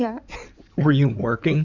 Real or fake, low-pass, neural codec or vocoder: fake; 7.2 kHz; codec, 16 kHz in and 24 kHz out, 2.2 kbps, FireRedTTS-2 codec